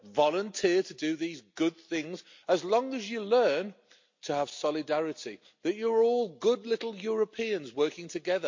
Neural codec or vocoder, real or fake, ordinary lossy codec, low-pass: none; real; MP3, 48 kbps; 7.2 kHz